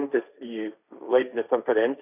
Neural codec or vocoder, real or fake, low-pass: codec, 16 kHz, 1.1 kbps, Voila-Tokenizer; fake; 3.6 kHz